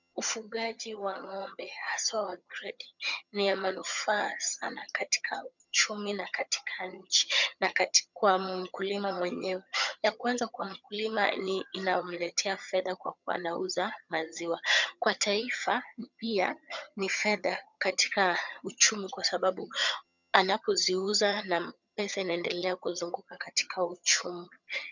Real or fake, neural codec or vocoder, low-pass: fake; vocoder, 22.05 kHz, 80 mel bands, HiFi-GAN; 7.2 kHz